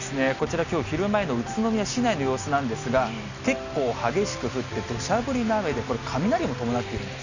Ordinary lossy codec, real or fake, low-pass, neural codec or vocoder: none; real; 7.2 kHz; none